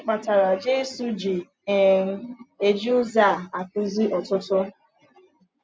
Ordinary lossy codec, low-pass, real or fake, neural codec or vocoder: none; none; real; none